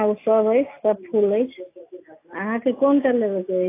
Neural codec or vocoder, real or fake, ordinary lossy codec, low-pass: none; real; AAC, 24 kbps; 3.6 kHz